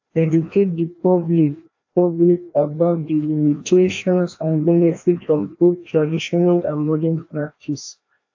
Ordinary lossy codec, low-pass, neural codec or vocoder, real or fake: AAC, 48 kbps; 7.2 kHz; codec, 16 kHz, 1 kbps, FreqCodec, larger model; fake